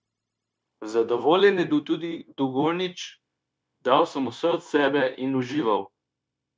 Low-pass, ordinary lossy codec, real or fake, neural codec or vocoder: none; none; fake; codec, 16 kHz, 0.9 kbps, LongCat-Audio-Codec